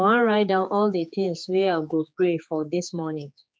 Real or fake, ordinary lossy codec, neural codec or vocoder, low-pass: fake; none; codec, 16 kHz, 4 kbps, X-Codec, HuBERT features, trained on general audio; none